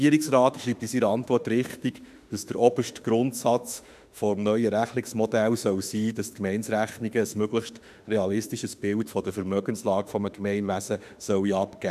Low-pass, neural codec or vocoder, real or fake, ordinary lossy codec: 14.4 kHz; autoencoder, 48 kHz, 32 numbers a frame, DAC-VAE, trained on Japanese speech; fake; none